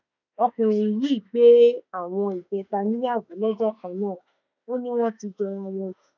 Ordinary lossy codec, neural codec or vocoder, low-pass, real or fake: none; autoencoder, 48 kHz, 32 numbers a frame, DAC-VAE, trained on Japanese speech; 7.2 kHz; fake